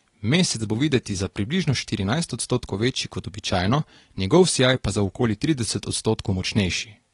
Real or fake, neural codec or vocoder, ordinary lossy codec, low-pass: real; none; AAC, 32 kbps; 10.8 kHz